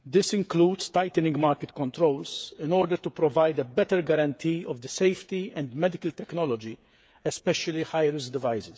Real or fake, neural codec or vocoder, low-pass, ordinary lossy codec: fake; codec, 16 kHz, 8 kbps, FreqCodec, smaller model; none; none